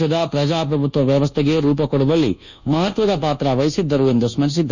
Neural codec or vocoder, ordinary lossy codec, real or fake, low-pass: codec, 24 kHz, 1.2 kbps, DualCodec; none; fake; 7.2 kHz